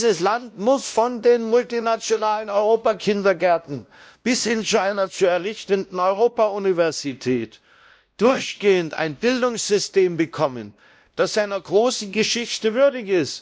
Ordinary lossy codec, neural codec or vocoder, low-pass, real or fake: none; codec, 16 kHz, 1 kbps, X-Codec, WavLM features, trained on Multilingual LibriSpeech; none; fake